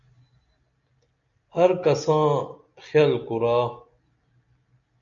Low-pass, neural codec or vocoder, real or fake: 7.2 kHz; none; real